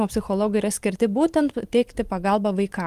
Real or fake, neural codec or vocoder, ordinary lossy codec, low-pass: fake; vocoder, 44.1 kHz, 128 mel bands every 512 samples, BigVGAN v2; Opus, 24 kbps; 14.4 kHz